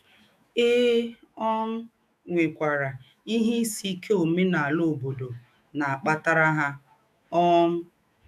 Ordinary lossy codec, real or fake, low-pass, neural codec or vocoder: none; fake; 14.4 kHz; autoencoder, 48 kHz, 128 numbers a frame, DAC-VAE, trained on Japanese speech